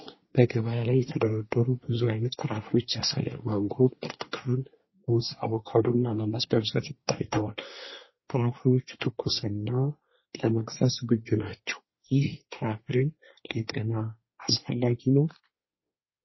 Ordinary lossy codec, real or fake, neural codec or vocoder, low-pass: MP3, 24 kbps; fake; codec, 24 kHz, 1 kbps, SNAC; 7.2 kHz